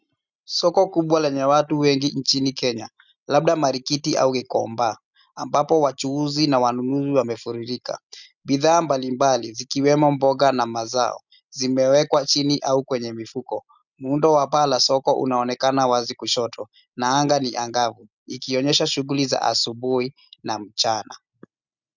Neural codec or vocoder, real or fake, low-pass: none; real; 7.2 kHz